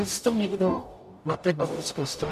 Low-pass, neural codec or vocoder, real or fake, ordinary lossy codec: 14.4 kHz; codec, 44.1 kHz, 0.9 kbps, DAC; fake; MP3, 96 kbps